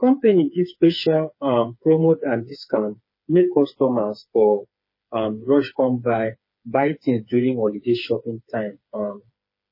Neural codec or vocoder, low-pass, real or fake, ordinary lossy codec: codec, 16 kHz, 4 kbps, FreqCodec, smaller model; 5.4 kHz; fake; MP3, 24 kbps